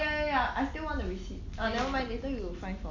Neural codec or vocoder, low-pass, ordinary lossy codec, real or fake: none; 7.2 kHz; MP3, 48 kbps; real